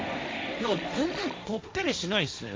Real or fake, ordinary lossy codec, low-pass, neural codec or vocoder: fake; none; none; codec, 16 kHz, 1.1 kbps, Voila-Tokenizer